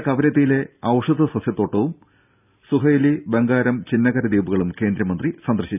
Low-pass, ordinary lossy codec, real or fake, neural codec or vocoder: 3.6 kHz; none; real; none